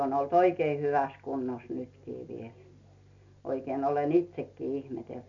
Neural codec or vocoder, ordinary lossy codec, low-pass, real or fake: none; none; 7.2 kHz; real